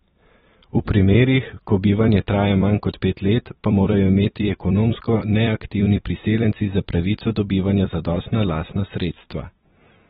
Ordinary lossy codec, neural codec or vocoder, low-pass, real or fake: AAC, 16 kbps; vocoder, 44.1 kHz, 128 mel bands every 256 samples, BigVGAN v2; 19.8 kHz; fake